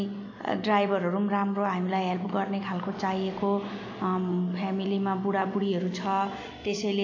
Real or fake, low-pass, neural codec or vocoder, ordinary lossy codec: real; 7.2 kHz; none; AAC, 48 kbps